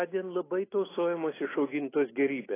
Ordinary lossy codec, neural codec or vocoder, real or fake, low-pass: AAC, 16 kbps; none; real; 3.6 kHz